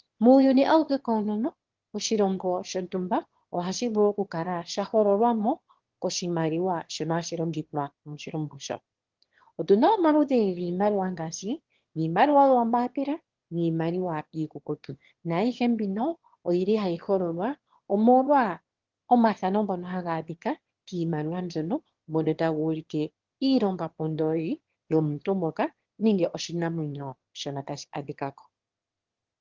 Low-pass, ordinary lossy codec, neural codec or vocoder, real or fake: 7.2 kHz; Opus, 16 kbps; autoencoder, 22.05 kHz, a latent of 192 numbers a frame, VITS, trained on one speaker; fake